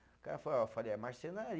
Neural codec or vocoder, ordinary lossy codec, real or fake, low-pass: none; none; real; none